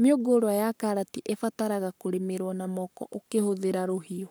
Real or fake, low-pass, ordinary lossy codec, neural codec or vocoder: fake; none; none; codec, 44.1 kHz, 7.8 kbps, Pupu-Codec